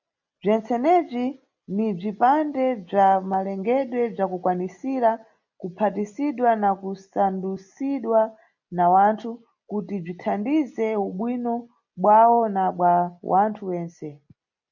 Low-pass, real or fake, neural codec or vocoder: 7.2 kHz; real; none